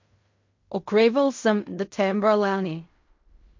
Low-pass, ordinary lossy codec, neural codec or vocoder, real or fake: 7.2 kHz; MP3, 48 kbps; codec, 16 kHz in and 24 kHz out, 0.4 kbps, LongCat-Audio-Codec, fine tuned four codebook decoder; fake